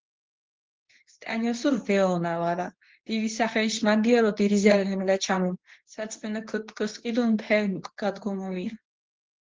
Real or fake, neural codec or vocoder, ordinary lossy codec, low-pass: fake; codec, 24 kHz, 0.9 kbps, WavTokenizer, medium speech release version 2; Opus, 16 kbps; 7.2 kHz